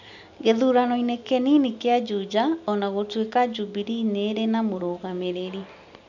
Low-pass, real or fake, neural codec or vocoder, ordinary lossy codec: 7.2 kHz; real; none; none